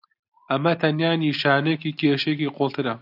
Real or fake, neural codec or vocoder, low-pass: real; none; 5.4 kHz